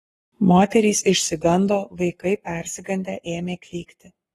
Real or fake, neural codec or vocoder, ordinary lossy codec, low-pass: fake; codec, 44.1 kHz, 7.8 kbps, Pupu-Codec; AAC, 32 kbps; 19.8 kHz